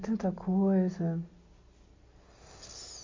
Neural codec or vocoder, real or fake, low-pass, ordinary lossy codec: none; real; 7.2 kHz; MP3, 48 kbps